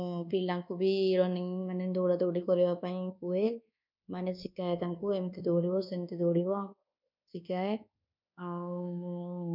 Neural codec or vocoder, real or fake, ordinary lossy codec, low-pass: codec, 24 kHz, 1.2 kbps, DualCodec; fake; none; 5.4 kHz